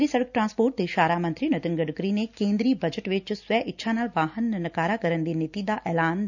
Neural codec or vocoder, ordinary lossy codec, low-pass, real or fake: none; none; 7.2 kHz; real